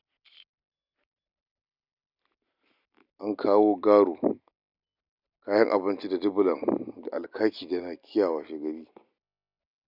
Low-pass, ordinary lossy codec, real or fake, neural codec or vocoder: 5.4 kHz; none; real; none